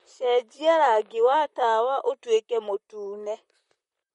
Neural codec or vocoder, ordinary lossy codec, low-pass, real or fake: vocoder, 44.1 kHz, 128 mel bands, Pupu-Vocoder; MP3, 48 kbps; 19.8 kHz; fake